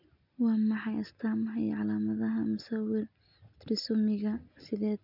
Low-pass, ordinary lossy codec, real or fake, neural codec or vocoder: 5.4 kHz; none; real; none